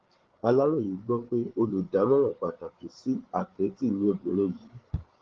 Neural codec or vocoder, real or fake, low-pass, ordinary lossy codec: codec, 16 kHz, 8 kbps, FreqCodec, larger model; fake; 7.2 kHz; Opus, 16 kbps